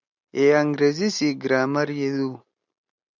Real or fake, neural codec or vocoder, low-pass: real; none; 7.2 kHz